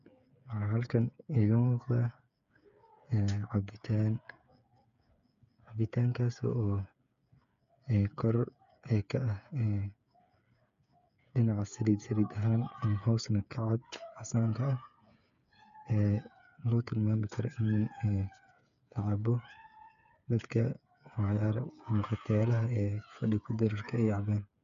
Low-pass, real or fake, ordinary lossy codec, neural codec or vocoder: 7.2 kHz; fake; none; codec, 16 kHz, 8 kbps, FreqCodec, smaller model